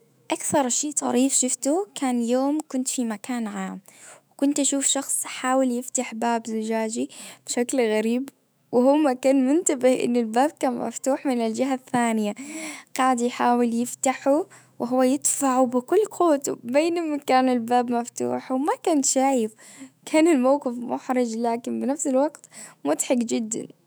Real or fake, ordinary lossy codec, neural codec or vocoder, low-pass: fake; none; autoencoder, 48 kHz, 128 numbers a frame, DAC-VAE, trained on Japanese speech; none